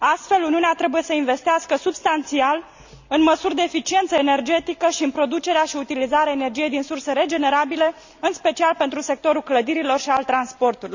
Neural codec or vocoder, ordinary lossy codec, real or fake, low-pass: none; Opus, 64 kbps; real; 7.2 kHz